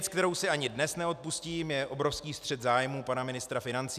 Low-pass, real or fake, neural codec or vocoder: 14.4 kHz; real; none